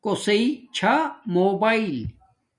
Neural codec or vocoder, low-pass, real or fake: none; 10.8 kHz; real